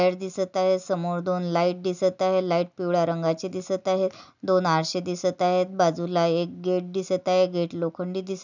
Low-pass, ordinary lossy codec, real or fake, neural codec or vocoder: 7.2 kHz; none; real; none